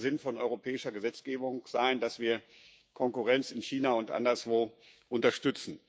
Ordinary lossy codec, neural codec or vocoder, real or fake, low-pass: none; codec, 16 kHz, 6 kbps, DAC; fake; none